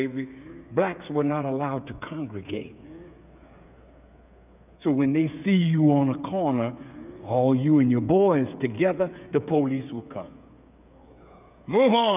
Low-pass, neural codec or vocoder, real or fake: 3.6 kHz; codec, 16 kHz, 8 kbps, FreqCodec, smaller model; fake